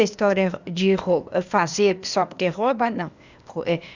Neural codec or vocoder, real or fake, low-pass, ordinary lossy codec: codec, 16 kHz, 0.8 kbps, ZipCodec; fake; 7.2 kHz; Opus, 64 kbps